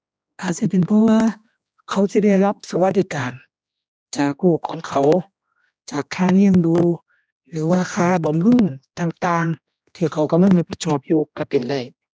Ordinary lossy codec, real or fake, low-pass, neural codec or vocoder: none; fake; none; codec, 16 kHz, 1 kbps, X-Codec, HuBERT features, trained on general audio